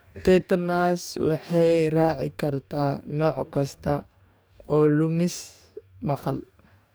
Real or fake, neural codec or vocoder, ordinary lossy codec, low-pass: fake; codec, 44.1 kHz, 2.6 kbps, DAC; none; none